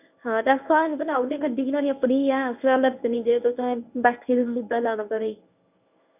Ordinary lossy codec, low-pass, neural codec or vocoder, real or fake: none; 3.6 kHz; codec, 24 kHz, 0.9 kbps, WavTokenizer, medium speech release version 1; fake